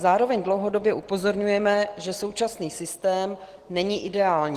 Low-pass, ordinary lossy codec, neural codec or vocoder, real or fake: 14.4 kHz; Opus, 16 kbps; none; real